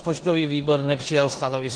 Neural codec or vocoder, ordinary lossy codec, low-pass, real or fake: codec, 16 kHz in and 24 kHz out, 0.9 kbps, LongCat-Audio-Codec, four codebook decoder; Opus, 16 kbps; 9.9 kHz; fake